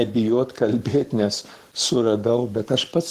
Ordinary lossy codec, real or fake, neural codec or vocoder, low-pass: Opus, 16 kbps; fake; codec, 44.1 kHz, 7.8 kbps, Pupu-Codec; 14.4 kHz